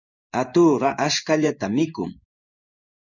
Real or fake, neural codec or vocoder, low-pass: fake; codec, 16 kHz in and 24 kHz out, 1 kbps, XY-Tokenizer; 7.2 kHz